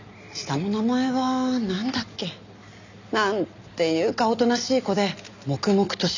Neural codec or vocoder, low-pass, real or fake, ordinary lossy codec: none; 7.2 kHz; real; none